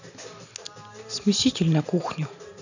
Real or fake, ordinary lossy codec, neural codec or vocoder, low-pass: real; none; none; 7.2 kHz